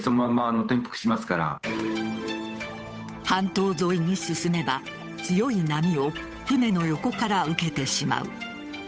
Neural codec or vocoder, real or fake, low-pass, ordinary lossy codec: codec, 16 kHz, 8 kbps, FunCodec, trained on Chinese and English, 25 frames a second; fake; none; none